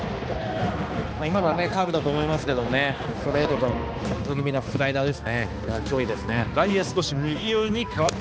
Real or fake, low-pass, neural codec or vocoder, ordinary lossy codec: fake; none; codec, 16 kHz, 2 kbps, X-Codec, HuBERT features, trained on balanced general audio; none